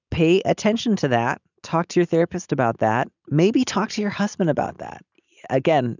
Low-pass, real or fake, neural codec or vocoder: 7.2 kHz; real; none